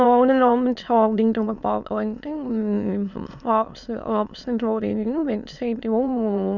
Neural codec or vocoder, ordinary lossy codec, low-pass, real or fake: autoencoder, 22.05 kHz, a latent of 192 numbers a frame, VITS, trained on many speakers; none; 7.2 kHz; fake